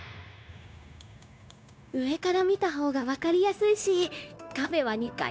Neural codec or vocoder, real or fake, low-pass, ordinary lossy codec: codec, 16 kHz, 0.9 kbps, LongCat-Audio-Codec; fake; none; none